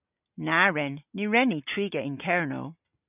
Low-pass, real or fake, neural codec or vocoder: 3.6 kHz; real; none